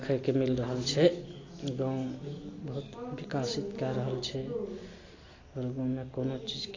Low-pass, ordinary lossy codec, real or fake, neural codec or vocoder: 7.2 kHz; AAC, 32 kbps; real; none